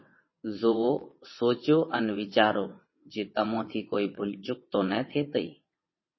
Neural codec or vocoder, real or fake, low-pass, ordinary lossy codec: vocoder, 22.05 kHz, 80 mel bands, WaveNeXt; fake; 7.2 kHz; MP3, 24 kbps